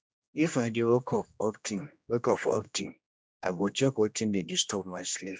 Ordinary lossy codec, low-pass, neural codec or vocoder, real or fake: none; none; codec, 16 kHz, 1 kbps, X-Codec, HuBERT features, trained on general audio; fake